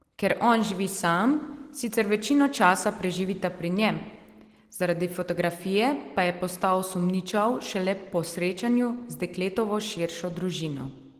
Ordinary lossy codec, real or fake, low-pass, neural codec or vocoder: Opus, 16 kbps; real; 14.4 kHz; none